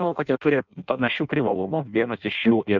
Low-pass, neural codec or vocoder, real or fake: 7.2 kHz; codec, 16 kHz in and 24 kHz out, 0.6 kbps, FireRedTTS-2 codec; fake